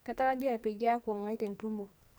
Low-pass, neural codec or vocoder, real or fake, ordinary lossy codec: none; codec, 44.1 kHz, 2.6 kbps, SNAC; fake; none